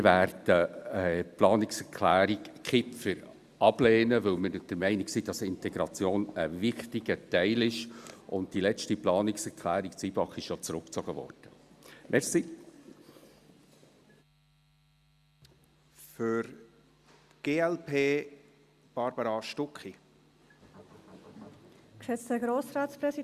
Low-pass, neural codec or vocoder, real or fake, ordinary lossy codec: 14.4 kHz; none; real; Opus, 64 kbps